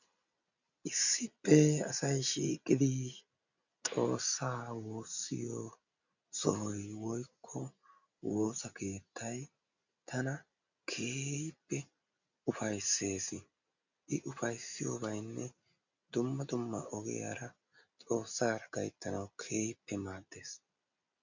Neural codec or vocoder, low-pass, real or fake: none; 7.2 kHz; real